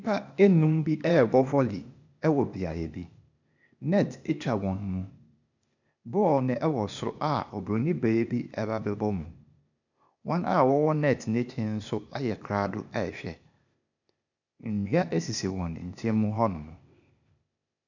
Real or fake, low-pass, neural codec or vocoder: fake; 7.2 kHz; codec, 16 kHz, 0.8 kbps, ZipCodec